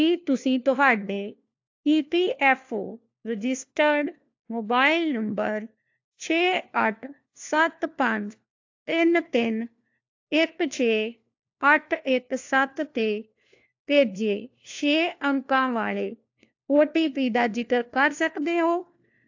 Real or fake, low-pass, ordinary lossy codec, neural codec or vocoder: fake; 7.2 kHz; AAC, 48 kbps; codec, 16 kHz, 1 kbps, FunCodec, trained on LibriTTS, 50 frames a second